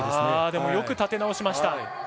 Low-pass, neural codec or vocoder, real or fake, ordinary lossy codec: none; none; real; none